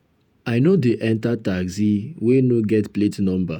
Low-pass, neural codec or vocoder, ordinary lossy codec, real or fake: 19.8 kHz; vocoder, 48 kHz, 128 mel bands, Vocos; none; fake